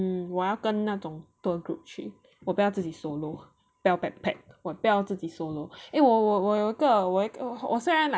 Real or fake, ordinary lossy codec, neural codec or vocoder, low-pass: real; none; none; none